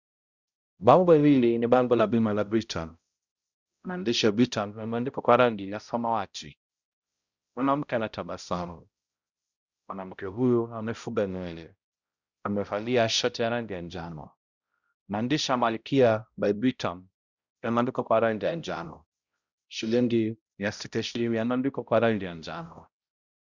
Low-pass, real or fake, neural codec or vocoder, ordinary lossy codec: 7.2 kHz; fake; codec, 16 kHz, 0.5 kbps, X-Codec, HuBERT features, trained on balanced general audio; Opus, 64 kbps